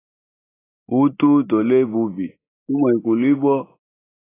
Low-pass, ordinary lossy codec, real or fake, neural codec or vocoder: 3.6 kHz; AAC, 16 kbps; real; none